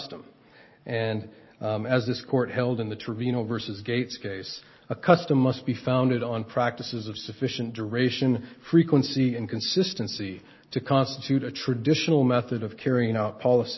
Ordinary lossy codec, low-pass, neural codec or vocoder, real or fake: MP3, 24 kbps; 7.2 kHz; none; real